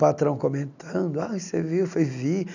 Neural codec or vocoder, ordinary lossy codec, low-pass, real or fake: none; none; 7.2 kHz; real